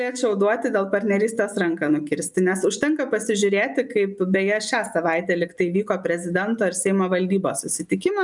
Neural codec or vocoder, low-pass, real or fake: none; 10.8 kHz; real